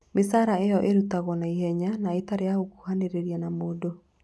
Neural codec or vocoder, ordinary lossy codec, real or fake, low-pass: none; none; real; none